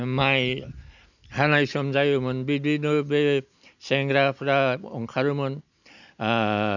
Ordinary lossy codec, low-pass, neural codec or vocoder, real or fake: none; 7.2 kHz; none; real